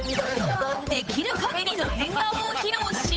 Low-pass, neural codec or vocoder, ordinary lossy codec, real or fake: none; codec, 16 kHz, 8 kbps, FunCodec, trained on Chinese and English, 25 frames a second; none; fake